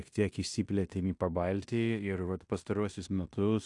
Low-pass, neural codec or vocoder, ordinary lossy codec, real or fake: 10.8 kHz; codec, 16 kHz in and 24 kHz out, 0.9 kbps, LongCat-Audio-Codec, fine tuned four codebook decoder; AAC, 64 kbps; fake